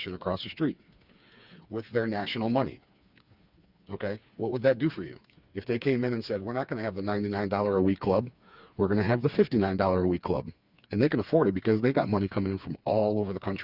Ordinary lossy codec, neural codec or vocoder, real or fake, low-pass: Opus, 64 kbps; codec, 16 kHz, 4 kbps, FreqCodec, smaller model; fake; 5.4 kHz